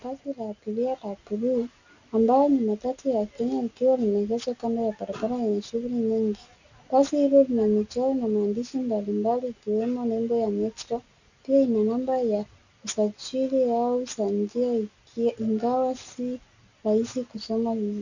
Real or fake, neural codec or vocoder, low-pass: real; none; 7.2 kHz